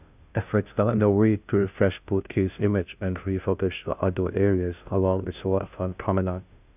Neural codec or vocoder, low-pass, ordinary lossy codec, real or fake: codec, 16 kHz, 0.5 kbps, FunCodec, trained on Chinese and English, 25 frames a second; 3.6 kHz; none; fake